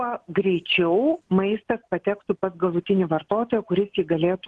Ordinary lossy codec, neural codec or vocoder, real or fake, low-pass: Opus, 16 kbps; none; real; 10.8 kHz